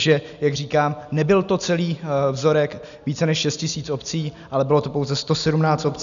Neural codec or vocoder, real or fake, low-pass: none; real; 7.2 kHz